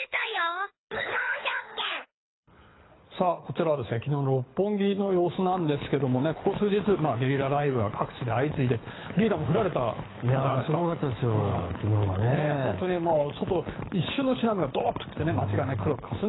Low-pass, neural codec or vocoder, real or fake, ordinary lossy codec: 7.2 kHz; codec, 24 kHz, 6 kbps, HILCodec; fake; AAC, 16 kbps